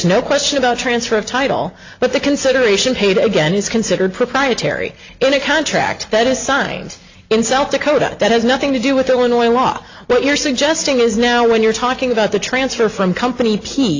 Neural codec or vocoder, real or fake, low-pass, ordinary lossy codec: none; real; 7.2 kHz; AAC, 48 kbps